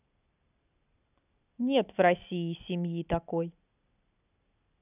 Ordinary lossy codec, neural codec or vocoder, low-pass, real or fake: none; none; 3.6 kHz; real